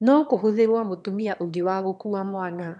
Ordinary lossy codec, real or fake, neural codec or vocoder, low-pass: none; fake; autoencoder, 22.05 kHz, a latent of 192 numbers a frame, VITS, trained on one speaker; none